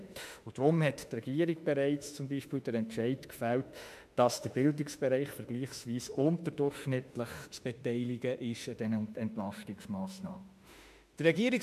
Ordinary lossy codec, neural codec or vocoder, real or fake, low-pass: MP3, 96 kbps; autoencoder, 48 kHz, 32 numbers a frame, DAC-VAE, trained on Japanese speech; fake; 14.4 kHz